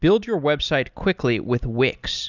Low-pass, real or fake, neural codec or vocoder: 7.2 kHz; real; none